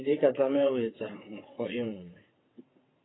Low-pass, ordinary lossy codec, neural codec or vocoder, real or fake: 7.2 kHz; AAC, 16 kbps; vocoder, 22.05 kHz, 80 mel bands, WaveNeXt; fake